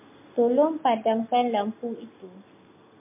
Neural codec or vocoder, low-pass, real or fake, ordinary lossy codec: none; 3.6 kHz; real; MP3, 32 kbps